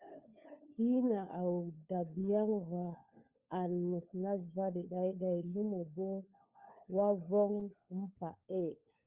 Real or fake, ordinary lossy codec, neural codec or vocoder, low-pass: fake; Opus, 32 kbps; codec, 16 kHz, 4 kbps, FunCodec, trained on LibriTTS, 50 frames a second; 3.6 kHz